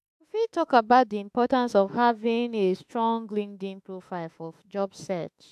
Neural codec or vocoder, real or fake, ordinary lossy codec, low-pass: autoencoder, 48 kHz, 32 numbers a frame, DAC-VAE, trained on Japanese speech; fake; AAC, 96 kbps; 14.4 kHz